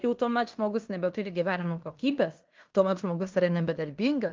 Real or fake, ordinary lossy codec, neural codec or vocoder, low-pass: fake; Opus, 32 kbps; codec, 16 kHz in and 24 kHz out, 0.9 kbps, LongCat-Audio-Codec, fine tuned four codebook decoder; 7.2 kHz